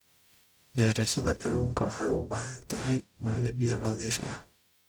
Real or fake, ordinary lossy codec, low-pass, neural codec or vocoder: fake; none; none; codec, 44.1 kHz, 0.9 kbps, DAC